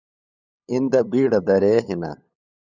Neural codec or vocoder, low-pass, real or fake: codec, 16 kHz, 8 kbps, FunCodec, trained on LibriTTS, 25 frames a second; 7.2 kHz; fake